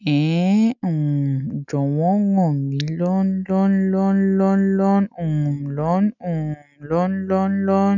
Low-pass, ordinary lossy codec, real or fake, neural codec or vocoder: 7.2 kHz; none; real; none